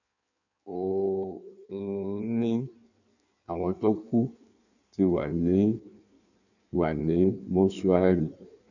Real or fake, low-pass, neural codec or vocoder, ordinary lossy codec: fake; 7.2 kHz; codec, 16 kHz in and 24 kHz out, 1.1 kbps, FireRedTTS-2 codec; none